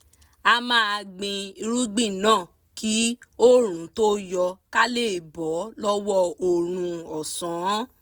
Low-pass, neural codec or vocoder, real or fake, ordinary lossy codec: none; none; real; none